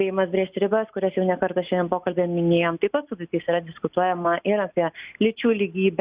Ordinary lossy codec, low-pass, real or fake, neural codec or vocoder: Opus, 64 kbps; 3.6 kHz; real; none